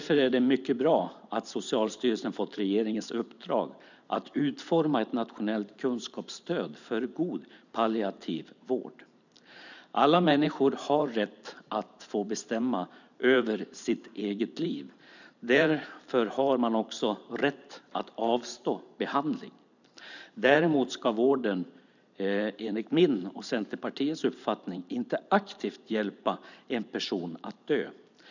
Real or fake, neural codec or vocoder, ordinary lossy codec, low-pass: fake; vocoder, 44.1 kHz, 128 mel bands every 512 samples, BigVGAN v2; none; 7.2 kHz